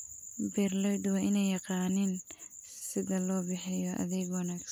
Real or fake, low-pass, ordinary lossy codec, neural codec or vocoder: real; none; none; none